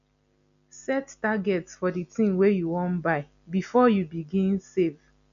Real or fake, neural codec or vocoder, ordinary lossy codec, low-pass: real; none; none; 7.2 kHz